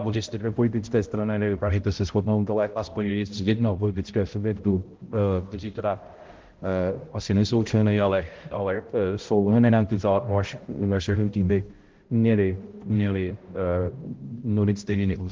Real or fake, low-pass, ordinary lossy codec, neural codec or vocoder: fake; 7.2 kHz; Opus, 16 kbps; codec, 16 kHz, 0.5 kbps, X-Codec, HuBERT features, trained on balanced general audio